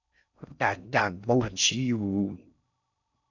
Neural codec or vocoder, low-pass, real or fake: codec, 16 kHz in and 24 kHz out, 0.6 kbps, FocalCodec, streaming, 4096 codes; 7.2 kHz; fake